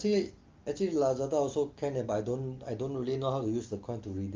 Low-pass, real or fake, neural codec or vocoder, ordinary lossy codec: 7.2 kHz; real; none; Opus, 24 kbps